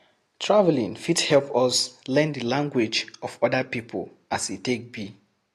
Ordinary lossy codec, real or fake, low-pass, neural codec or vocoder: AAC, 48 kbps; real; 14.4 kHz; none